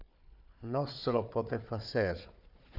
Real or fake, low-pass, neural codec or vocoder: fake; 5.4 kHz; codec, 16 kHz, 4 kbps, FunCodec, trained on Chinese and English, 50 frames a second